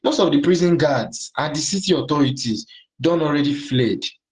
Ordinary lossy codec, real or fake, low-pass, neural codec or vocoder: Opus, 16 kbps; real; 10.8 kHz; none